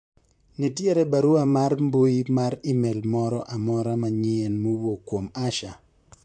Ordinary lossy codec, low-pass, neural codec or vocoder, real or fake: MP3, 64 kbps; 9.9 kHz; vocoder, 44.1 kHz, 128 mel bands, Pupu-Vocoder; fake